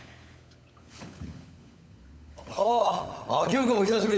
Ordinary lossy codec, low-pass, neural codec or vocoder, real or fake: none; none; codec, 16 kHz, 16 kbps, FunCodec, trained on LibriTTS, 50 frames a second; fake